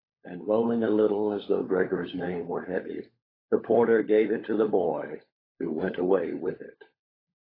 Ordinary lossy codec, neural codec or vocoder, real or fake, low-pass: AAC, 24 kbps; codec, 16 kHz, 16 kbps, FunCodec, trained on LibriTTS, 50 frames a second; fake; 5.4 kHz